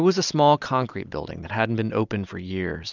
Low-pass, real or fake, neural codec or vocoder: 7.2 kHz; real; none